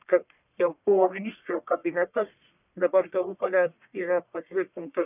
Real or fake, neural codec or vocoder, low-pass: fake; codec, 44.1 kHz, 1.7 kbps, Pupu-Codec; 3.6 kHz